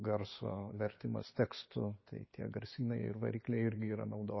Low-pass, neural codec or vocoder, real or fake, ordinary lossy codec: 7.2 kHz; none; real; MP3, 24 kbps